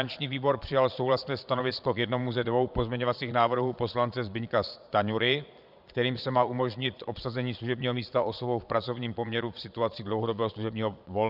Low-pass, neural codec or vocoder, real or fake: 5.4 kHz; vocoder, 22.05 kHz, 80 mel bands, Vocos; fake